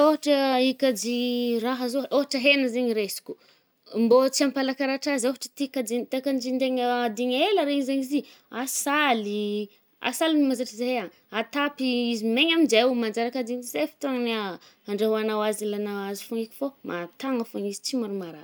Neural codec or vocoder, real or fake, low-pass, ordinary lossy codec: none; real; none; none